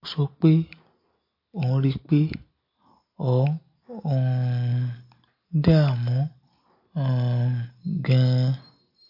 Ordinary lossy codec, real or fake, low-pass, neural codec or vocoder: MP3, 32 kbps; real; 5.4 kHz; none